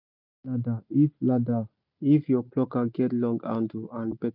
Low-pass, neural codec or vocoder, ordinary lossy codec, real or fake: 5.4 kHz; none; none; real